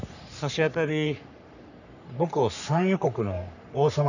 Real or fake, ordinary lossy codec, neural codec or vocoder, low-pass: fake; none; codec, 44.1 kHz, 3.4 kbps, Pupu-Codec; 7.2 kHz